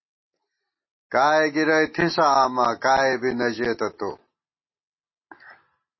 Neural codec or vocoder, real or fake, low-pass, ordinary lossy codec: none; real; 7.2 kHz; MP3, 24 kbps